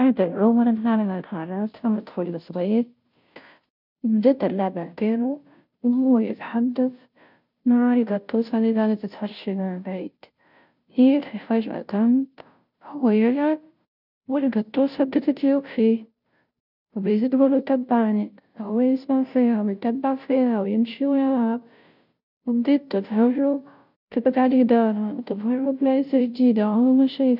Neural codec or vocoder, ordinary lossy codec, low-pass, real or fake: codec, 16 kHz, 0.5 kbps, FunCodec, trained on Chinese and English, 25 frames a second; none; 5.4 kHz; fake